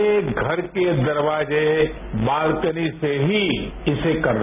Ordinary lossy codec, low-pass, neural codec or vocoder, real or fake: none; 3.6 kHz; none; real